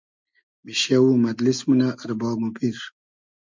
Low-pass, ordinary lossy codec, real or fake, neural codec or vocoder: 7.2 kHz; MP3, 64 kbps; real; none